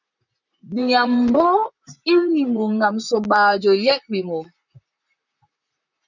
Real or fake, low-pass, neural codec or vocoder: fake; 7.2 kHz; vocoder, 44.1 kHz, 128 mel bands, Pupu-Vocoder